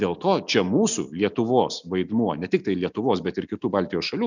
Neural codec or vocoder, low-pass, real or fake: none; 7.2 kHz; real